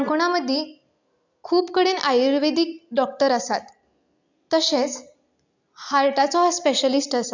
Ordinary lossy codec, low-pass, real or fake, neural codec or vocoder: none; 7.2 kHz; real; none